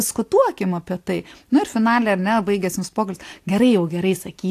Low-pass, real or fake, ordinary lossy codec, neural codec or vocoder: 14.4 kHz; real; AAC, 64 kbps; none